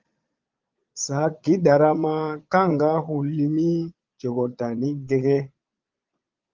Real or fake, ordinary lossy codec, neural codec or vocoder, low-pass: fake; Opus, 32 kbps; vocoder, 22.05 kHz, 80 mel bands, Vocos; 7.2 kHz